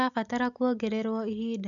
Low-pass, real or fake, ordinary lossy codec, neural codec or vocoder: 7.2 kHz; real; none; none